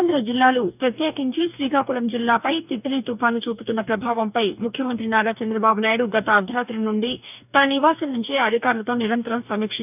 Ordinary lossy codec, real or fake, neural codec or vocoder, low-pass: none; fake; codec, 44.1 kHz, 2.6 kbps, DAC; 3.6 kHz